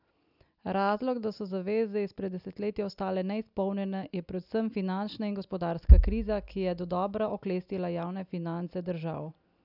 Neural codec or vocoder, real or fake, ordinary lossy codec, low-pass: none; real; none; 5.4 kHz